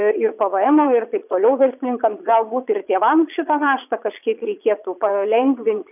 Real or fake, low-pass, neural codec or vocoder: fake; 3.6 kHz; vocoder, 22.05 kHz, 80 mel bands, Vocos